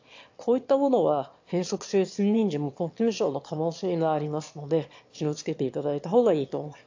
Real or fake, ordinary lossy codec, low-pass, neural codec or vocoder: fake; AAC, 48 kbps; 7.2 kHz; autoencoder, 22.05 kHz, a latent of 192 numbers a frame, VITS, trained on one speaker